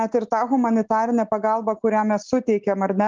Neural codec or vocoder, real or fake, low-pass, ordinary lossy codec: none; real; 7.2 kHz; Opus, 32 kbps